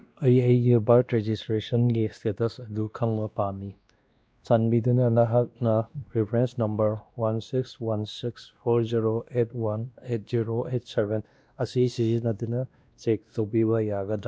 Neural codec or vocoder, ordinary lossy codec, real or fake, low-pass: codec, 16 kHz, 1 kbps, X-Codec, WavLM features, trained on Multilingual LibriSpeech; none; fake; none